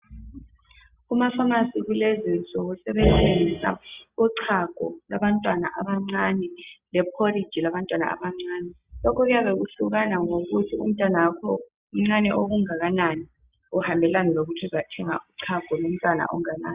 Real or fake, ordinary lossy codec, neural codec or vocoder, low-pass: real; Opus, 64 kbps; none; 3.6 kHz